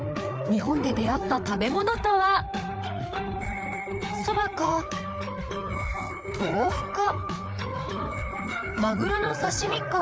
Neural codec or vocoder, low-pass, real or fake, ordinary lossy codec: codec, 16 kHz, 4 kbps, FreqCodec, larger model; none; fake; none